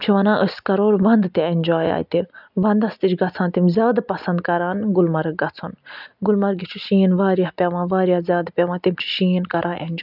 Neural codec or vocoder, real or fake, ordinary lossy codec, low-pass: none; real; none; 5.4 kHz